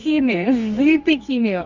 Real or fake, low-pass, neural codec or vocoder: fake; 7.2 kHz; codec, 24 kHz, 0.9 kbps, WavTokenizer, medium music audio release